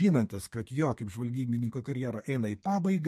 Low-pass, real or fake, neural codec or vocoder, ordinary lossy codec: 14.4 kHz; fake; codec, 32 kHz, 1.9 kbps, SNAC; MP3, 64 kbps